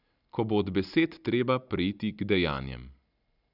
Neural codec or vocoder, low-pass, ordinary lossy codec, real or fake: none; 5.4 kHz; none; real